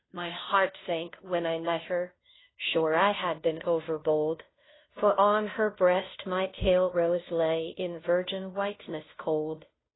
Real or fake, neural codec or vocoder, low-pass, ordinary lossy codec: fake; codec, 16 kHz, 0.5 kbps, FunCodec, trained on Chinese and English, 25 frames a second; 7.2 kHz; AAC, 16 kbps